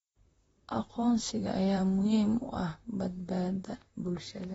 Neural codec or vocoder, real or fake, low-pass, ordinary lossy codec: vocoder, 44.1 kHz, 128 mel bands, Pupu-Vocoder; fake; 19.8 kHz; AAC, 24 kbps